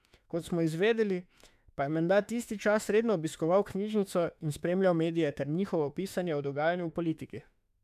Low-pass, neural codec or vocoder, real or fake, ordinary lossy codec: 14.4 kHz; autoencoder, 48 kHz, 32 numbers a frame, DAC-VAE, trained on Japanese speech; fake; none